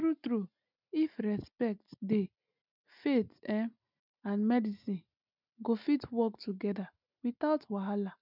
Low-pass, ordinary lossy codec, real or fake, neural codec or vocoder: 5.4 kHz; none; real; none